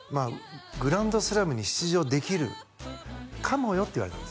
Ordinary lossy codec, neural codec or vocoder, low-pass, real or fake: none; none; none; real